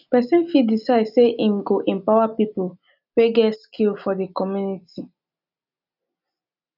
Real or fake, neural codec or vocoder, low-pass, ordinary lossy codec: real; none; 5.4 kHz; none